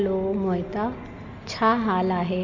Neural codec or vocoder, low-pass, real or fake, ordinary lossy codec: none; 7.2 kHz; real; none